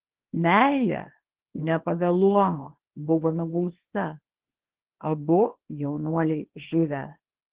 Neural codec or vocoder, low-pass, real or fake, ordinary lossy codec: codec, 24 kHz, 0.9 kbps, WavTokenizer, small release; 3.6 kHz; fake; Opus, 16 kbps